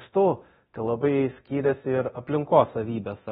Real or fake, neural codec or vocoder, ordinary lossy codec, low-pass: fake; codec, 24 kHz, 0.9 kbps, DualCodec; AAC, 16 kbps; 10.8 kHz